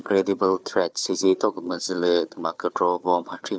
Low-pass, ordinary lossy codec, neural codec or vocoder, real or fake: none; none; codec, 16 kHz, 4 kbps, FunCodec, trained on Chinese and English, 50 frames a second; fake